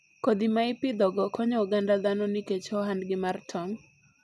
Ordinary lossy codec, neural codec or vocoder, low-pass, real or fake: none; none; none; real